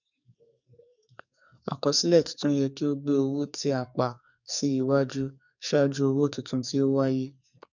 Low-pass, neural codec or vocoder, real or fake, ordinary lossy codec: 7.2 kHz; codec, 44.1 kHz, 2.6 kbps, SNAC; fake; none